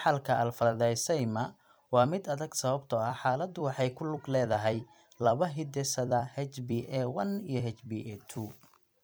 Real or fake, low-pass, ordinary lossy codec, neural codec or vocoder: fake; none; none; vocoder, 44.1 kHz, 128 mel bands every 256 samples, BigVGAN v2